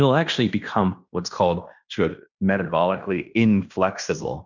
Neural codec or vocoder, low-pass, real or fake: codec, 16 kHz in and 24 kHz out, 0.9 kbps, LongCat-Audio-Codec, fine tuned four codebook decoder; 7.2 kHz; fake